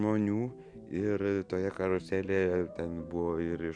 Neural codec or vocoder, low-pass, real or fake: none; 9.9 kHz; real